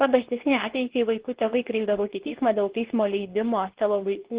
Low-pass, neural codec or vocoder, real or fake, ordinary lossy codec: 3.6 kHz; codec, 24 kHz, 0.9 kbps, WavTokenizer, medium speech release version 2; fake; Opus, 16 kbps